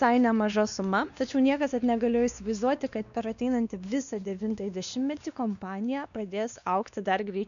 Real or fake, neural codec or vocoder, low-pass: fake; codec, 16 kHz, 2 kbps, X-Codec, WavLM features, trained on Multilingual LibriSpeech; 7.2 kHz